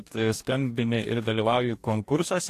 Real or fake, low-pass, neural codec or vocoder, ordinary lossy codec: fake; 14.4 kHz; codec, 44.1 kHz, 2.6 kbps, DAC; AAC, 64 kbps